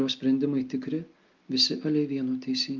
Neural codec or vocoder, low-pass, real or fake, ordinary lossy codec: none; 7.2 kHz; real; Opus, 32 kbps